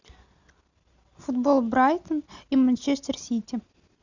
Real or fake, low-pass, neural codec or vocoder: real; 7.2 kHz; none